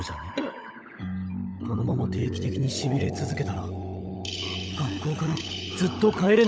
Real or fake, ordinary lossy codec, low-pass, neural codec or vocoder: fake; none; none; codec, 16 kHz, 16 kbps, FunCodec, trained on LibriTTS, 50 frames a second